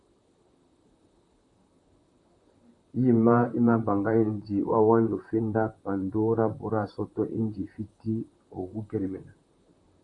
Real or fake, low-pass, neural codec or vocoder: fake; 10.8 kHz; vocoder, 44.1 kHz, 128 mel bands, Pupu-Vocoder